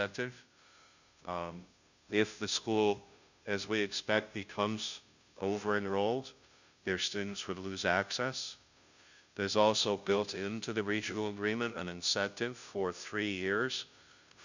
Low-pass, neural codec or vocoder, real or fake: 7.2 kHz; codec, 16 kHz, 0.5 kbps, FunCodec, trained on Chinese and English, 25 frames a second; fake